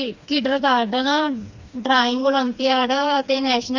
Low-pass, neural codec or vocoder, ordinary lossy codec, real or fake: 7.2 kHz; codec, 16 kHz, 2 kbps, FreqCodec, smaller model; Opus, 64 kbps; fake